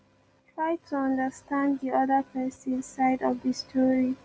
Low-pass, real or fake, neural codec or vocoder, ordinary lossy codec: none; real; none; none